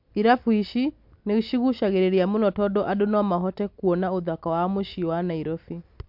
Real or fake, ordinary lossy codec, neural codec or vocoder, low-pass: real; MP3, 48 kbps; none; 5.4 kHz